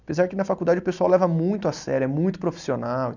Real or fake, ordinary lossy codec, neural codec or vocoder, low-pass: real; none; none; 7.2 kHz